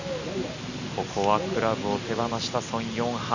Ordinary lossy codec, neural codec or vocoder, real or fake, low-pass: none; none; real; 7.2 kHz